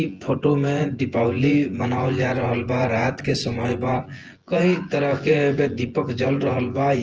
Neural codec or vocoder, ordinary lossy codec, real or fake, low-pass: vocoder, 24 kHz, 100 mel bands, Vocos; Opus, 16 kbps; fake; 7.2 kHz